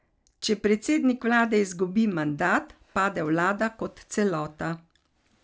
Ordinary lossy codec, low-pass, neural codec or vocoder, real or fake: none; none; none; real